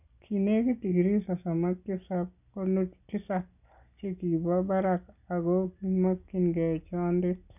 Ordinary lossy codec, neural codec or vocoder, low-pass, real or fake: none; none; 3.6 kHz; real